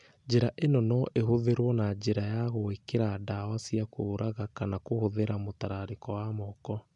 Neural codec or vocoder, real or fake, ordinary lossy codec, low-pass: none; real; none; 10.8 kHz